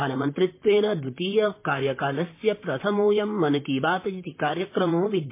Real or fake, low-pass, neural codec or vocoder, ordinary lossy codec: fake; 3.6 kHz; vocoder, 44.1 kHz, 128 mel bands, Pupu-Vocoder; MP3, 24 kbps